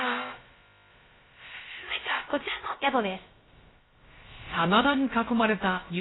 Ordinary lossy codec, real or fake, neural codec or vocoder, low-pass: AAC, 16 kbps; fake; codec, 16 kHz, about 1 kbps, DyCAST, with the encoder's durations; 7.2 kHz